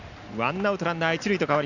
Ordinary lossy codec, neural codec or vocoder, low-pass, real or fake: none; none; 7.2 kHz; real